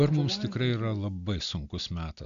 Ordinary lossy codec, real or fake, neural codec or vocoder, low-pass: AAC, 96 kbps; real; none; 7.2 kHz